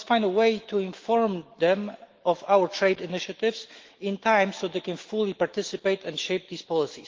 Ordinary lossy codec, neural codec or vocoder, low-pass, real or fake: Opus, 16 kbps; autoencoder, 48 kHz, 128 numbers a frame, DAC-VAE, trained on Japanese speech; 7.2 kHz; fake